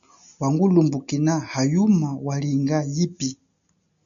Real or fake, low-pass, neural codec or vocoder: real; 7.2 kHz; none